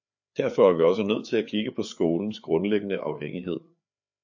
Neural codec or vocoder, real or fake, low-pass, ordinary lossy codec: codec, 16 kHz, 4 kbps, FreqCodec, larger model; fake; 7.2 kHz; AAC, 48 kbps